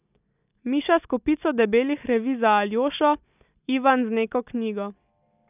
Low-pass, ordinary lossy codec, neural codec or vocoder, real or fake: 3.6 kHz; none; none; real